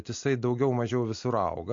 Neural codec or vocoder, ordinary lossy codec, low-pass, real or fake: none; MP3, 48 kbps; 7.2 kHz; real